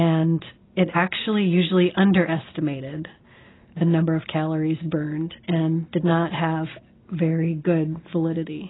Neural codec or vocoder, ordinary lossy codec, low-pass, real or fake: none; AAC, 16 kbps; 7.2 kHz; real